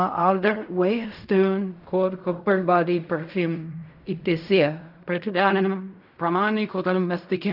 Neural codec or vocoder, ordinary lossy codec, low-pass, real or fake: codec, 16 kHz in and 24 kHz out, 0.4 kbps, LongCat-Audio-Codec, fine tuned four codebook decoder; none; 5.4 kHz; fake